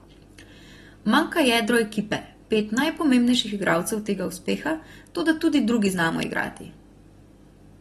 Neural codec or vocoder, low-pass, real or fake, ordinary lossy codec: none; 19.8 kHz; real; AAC, 32 kbps